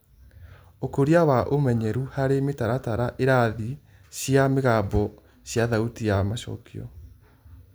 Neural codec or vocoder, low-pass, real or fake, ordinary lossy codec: none; none; real; none